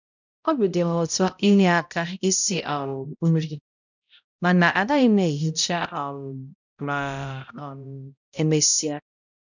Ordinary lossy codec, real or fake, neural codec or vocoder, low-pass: none; fake; codec, 16 kHz, 0.5 kbps, X-Codec, HuBERT features, trained on balanced general audio; 7.2 kHz